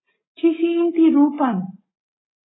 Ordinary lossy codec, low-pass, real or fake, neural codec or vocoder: AAC, 16 kbps; 7.2 kHz; real; none